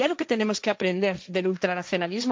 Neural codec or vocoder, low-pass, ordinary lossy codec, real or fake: codec, 16 kHz, 1.1 kbps, Voila-Tokenizer; none; none; fake